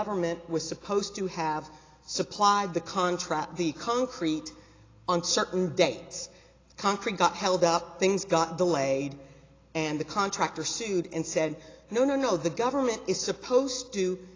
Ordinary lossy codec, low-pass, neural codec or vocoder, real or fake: AAC, 32 kbps; 7.2 kHz; none; real